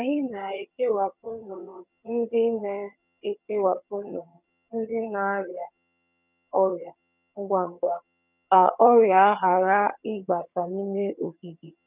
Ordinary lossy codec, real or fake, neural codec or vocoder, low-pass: none; fake; vocoder, 22.05 kHz, 80 mel bands, HiFi-GAN; 3.6 kHz